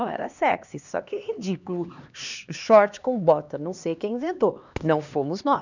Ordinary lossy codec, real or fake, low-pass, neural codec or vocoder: none; fake; 7.2 kHz; codec, 16 kHz, 2 kbps, X-Codec, HuBERT features, trained on LibriSpeech